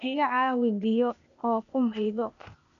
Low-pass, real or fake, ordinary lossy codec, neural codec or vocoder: 7.2 kHz; fake; none; codec, 16 kHz, 0.8 kbps, ZipCodec